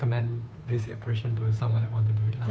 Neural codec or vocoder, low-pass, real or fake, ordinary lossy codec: codec, 16 kHz, 2 kbps, FunCodec, trained on Chinese and English, 25 frames a second; none; fake; none